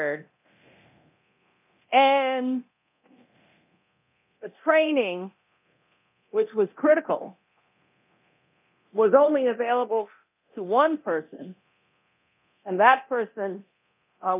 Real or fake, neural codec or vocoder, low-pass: fake; codec, 24 kHz, 0.9 kbps, DualCodec; 3.6 kHz